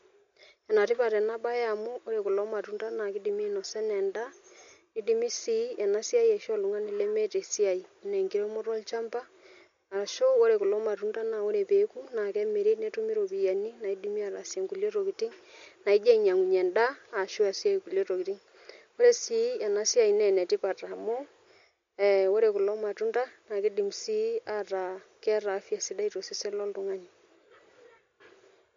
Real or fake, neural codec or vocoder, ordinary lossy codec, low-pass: real; none; MP3, 48 kbps; 7.2 kHz